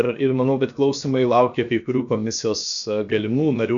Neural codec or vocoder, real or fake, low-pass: codec, 16 kHz, 0.7 kbps, FocalCodec; fake; 7.2 kHz